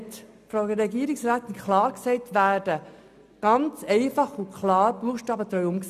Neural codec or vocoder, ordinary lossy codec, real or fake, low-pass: none; none; real; 14.4 kHz